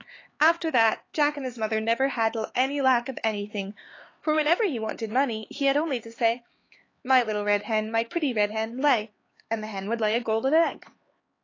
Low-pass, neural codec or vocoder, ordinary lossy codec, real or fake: 7.2 kHz; codec, 16 kHz, 4 kbps, X-Codec, HuBERT features, trained on LibriSpeech; AAC, 32 kbps; fake